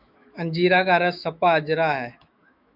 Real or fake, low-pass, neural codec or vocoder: fake; 5.4 kHz; autoencoder, 48 kHz, 128 numbers a frame, DAC-VAE, trained on Japanese speech